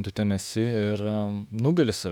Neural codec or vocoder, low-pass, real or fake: autoencoder, 48 kHz, 32 numbers a frame, DAC-VAE, trained on Japanese speech; 19.8 kHz; fake